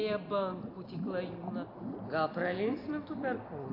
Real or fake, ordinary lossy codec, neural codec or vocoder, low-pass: real; AAC, 32 kbps; none; 5.4 kHz